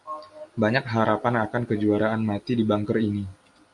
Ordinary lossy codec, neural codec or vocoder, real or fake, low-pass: AAC, 64 kbps; none; real; 10.8 kHz